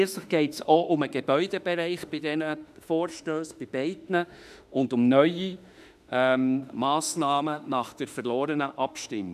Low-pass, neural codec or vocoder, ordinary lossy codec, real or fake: 14.4 kHz; autoencoder, 48 kHz, 32 numbers a frame, DAC-VAE, trained on Japanese speech; none; fake